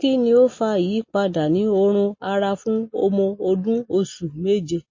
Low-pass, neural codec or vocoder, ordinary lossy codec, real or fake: 7.2 kHz; none; MP3, 32 kbps; real